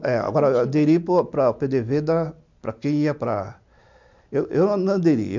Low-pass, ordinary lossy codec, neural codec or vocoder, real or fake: 7.2 kHz; MP3, 64 kbps; none; real